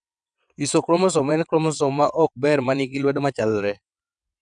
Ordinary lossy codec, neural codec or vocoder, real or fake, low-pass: none; vocoder, 22.05 kHz, 80 mel bands, WaveNeXt; fake; 9.9 kHz